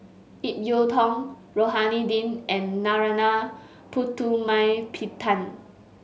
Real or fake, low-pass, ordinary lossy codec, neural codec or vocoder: real; none; none; none